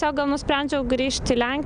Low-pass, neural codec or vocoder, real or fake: 9.9 kHz; none; real